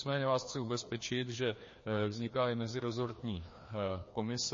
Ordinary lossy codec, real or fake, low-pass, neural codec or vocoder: MP3, 32 kbps; fake; 7.2 kHz; codec, 16 kHz, 2 kbps, FreqCodec, larger model